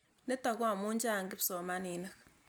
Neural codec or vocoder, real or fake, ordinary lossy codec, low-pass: none; real; none; none